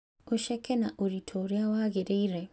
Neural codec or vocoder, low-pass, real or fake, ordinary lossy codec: none; none; real; none